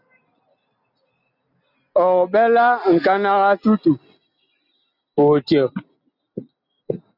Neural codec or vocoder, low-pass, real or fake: none; 5.4 kHz; real